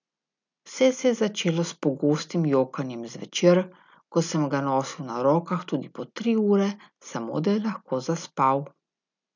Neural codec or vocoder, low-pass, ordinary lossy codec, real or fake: none; 7.2 kHz; none; real